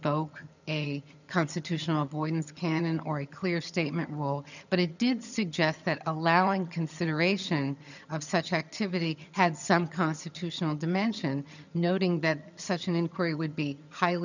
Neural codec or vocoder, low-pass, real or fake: vocoder, 22.05 kHz, 80 mel bands, HiFi-GAN; 7.2 kHz; fake